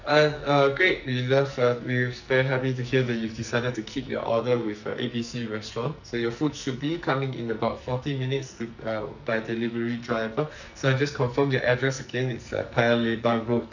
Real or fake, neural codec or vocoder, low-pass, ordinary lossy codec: fake; codec, 44.1 kHz, 2.6 kbps, SNAC; 7.2 kHz; none